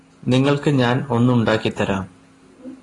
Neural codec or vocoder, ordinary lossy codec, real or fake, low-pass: none; AAC, 32 kbps; real; 10.8 kHz